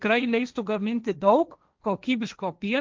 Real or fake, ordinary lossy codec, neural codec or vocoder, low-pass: fake; Opus, 24 kbps; codec, 16 kHz, 1.1 kbps, Voila-Tokenizer; 7.2 kHz